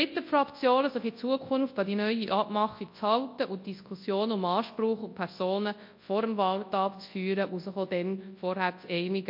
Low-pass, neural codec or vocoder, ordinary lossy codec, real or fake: 5.4 kHz; codec, 24 kHz, 0.9 kbps, WavTokenizer, large speech release; MP3, 32 kbps; fake